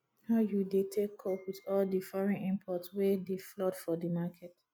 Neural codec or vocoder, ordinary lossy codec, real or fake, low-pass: none; none; real; none